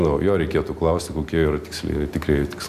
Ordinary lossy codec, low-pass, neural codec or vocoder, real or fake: MP3, 96 kbps; 14.4 kHz; none; real